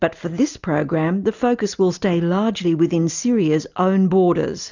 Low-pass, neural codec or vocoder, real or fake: 7.2 kHz; none; real